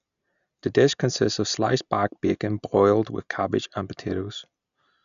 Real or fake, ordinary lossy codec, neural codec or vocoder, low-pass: real; none; none; 7.2 kHz